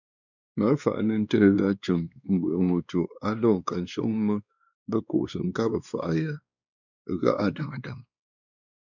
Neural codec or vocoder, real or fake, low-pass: codec, 16 kHz, 2 kbps, X-Codec, WavLM features, trained on Multilingual LibriSpeech; fake; 7.2 kHz